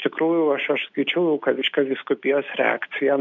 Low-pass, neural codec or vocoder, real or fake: 7.2 kHz; none; real